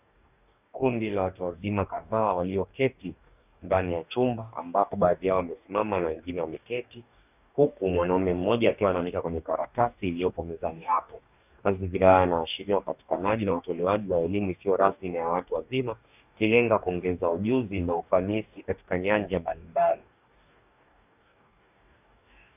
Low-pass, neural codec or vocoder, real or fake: 3.6 kHz; codec, 44.1 kHz, 2.6 kbps, DAC; fake